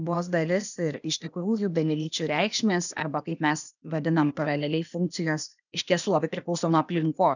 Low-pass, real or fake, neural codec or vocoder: 7.2 kHz; fake; codec, 16 kHz, 0.8 kbps, ZipCodec